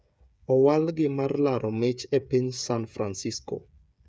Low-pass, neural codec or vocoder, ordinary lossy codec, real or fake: none; codec, 16 kHz, 8 kbps, FreqCodec, smaller model; none; fake